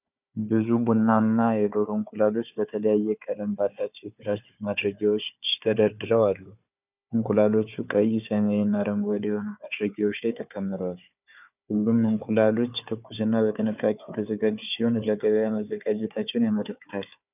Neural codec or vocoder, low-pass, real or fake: codec, 16 kHz, 4 kbps, FunCodec, trained on Chinese and English, 50 frames a second; 3.6 kHz; fake